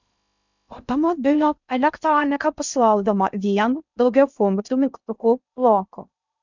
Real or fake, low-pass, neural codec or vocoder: fake; 7.2 kHz; codec, 16 kHz in and 24 kHz out, 0.6 kbps, FocalCodec, streaming, 2048 codes